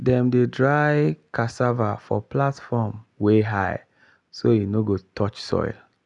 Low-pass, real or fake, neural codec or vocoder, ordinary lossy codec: 10.8 kHz; real; none; none